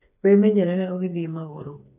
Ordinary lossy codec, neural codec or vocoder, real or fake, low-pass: AAC, 32 kbps; codec, 16 kHz, 4 kbps, FreqCodec, smaller model; fake; 3.6 kHz